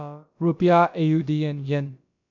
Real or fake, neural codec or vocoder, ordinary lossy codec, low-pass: fake; codec, 16 kHz, about 1 kbps, DyCAST, with the encoder's durations; none; 7.2 kHz